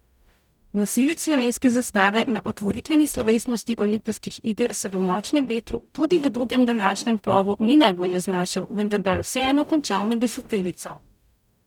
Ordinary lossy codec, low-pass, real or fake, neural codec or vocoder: none; 19.8 kHz; fake; codec, 44.1 kHz, 0.9 kbps, DAC